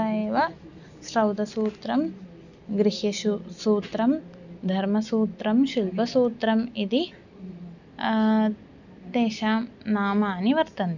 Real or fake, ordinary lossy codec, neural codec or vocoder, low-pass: real; none; none; 7.2 kHz